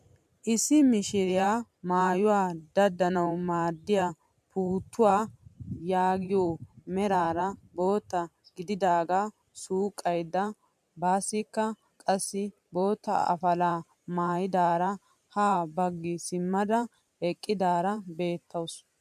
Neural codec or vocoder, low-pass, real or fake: vocoder, 44.1 kHz, 128 mel bands every 512 samples, BigVGAN v2; 14.4 kHz; fake